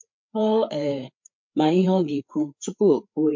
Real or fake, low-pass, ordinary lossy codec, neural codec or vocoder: fake; 7.2 kHz; MP3, 48 kbps; codec, 16 kHz, 4 kbps, FreqCodec, larger model